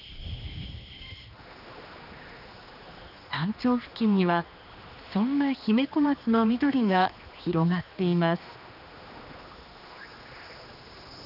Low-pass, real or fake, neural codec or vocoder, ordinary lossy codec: 5.4 kHz; fake; codec, 16 kHz, 2 kbps, X-Codec, HuBERT features, trained on general audio; none